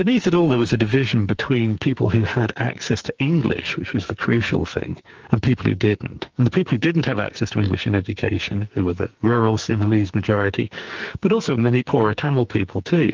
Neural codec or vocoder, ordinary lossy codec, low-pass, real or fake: codec, 44.1 kHz, 2.6 kbps, SNAC; Opus, 24 kbps; 7.2 kHz; fake